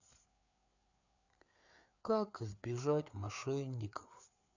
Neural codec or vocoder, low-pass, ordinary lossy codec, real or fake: codec, 16 kHz, 4 kbps, FreqCodec, larger model; 7.2 kHz; none; fake